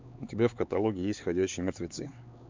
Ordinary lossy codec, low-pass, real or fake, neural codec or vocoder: MP3, 64 kbps; 7.2 kHz; fake; codec, 16 kHz, 4 kbps, X-Codec, HuBERT features, trained on LibriSpeech